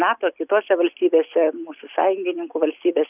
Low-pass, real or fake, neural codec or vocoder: 3.6 kHz; real; none